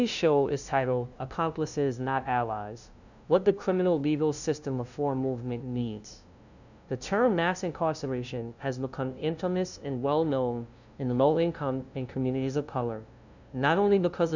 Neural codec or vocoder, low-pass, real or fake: codec, 16 kHz, 0.5 kbps, FunCodec, trained on LibriTTS, 25 frames a second; 7.2 kHz; fake